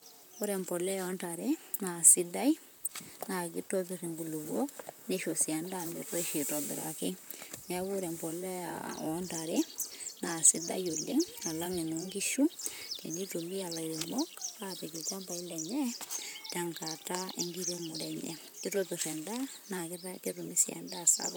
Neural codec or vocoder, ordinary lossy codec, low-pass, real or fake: vocoder, 44.1 kHz, 128 mel bands, Pupu-Vocoder; none; none; fake